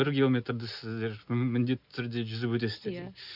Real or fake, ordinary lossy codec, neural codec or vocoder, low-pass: real; none; none; 5.4 kHz